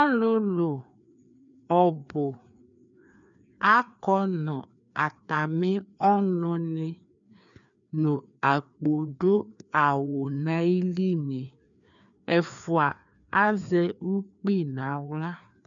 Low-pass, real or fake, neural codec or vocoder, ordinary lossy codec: 7.2 kHz; fake; codec, 16 kHz, 2 kbps, FreqCodec, larger model; MP3, 96 kbps